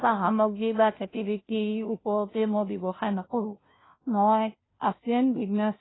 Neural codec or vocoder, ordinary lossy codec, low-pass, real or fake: codec, 16 kHz, 0.5 kbps, FunCodec, trained on Chinese and English, 25 frames a second; AAC, 16 kbps; 7.2 kHz; fake